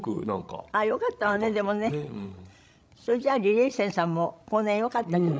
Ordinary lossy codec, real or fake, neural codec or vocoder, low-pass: none; fake; codec, 16 kHz, 16 kbps, FreqCodec, larger model; none